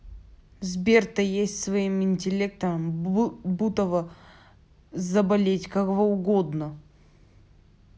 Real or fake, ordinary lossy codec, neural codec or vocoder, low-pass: real; none; none; none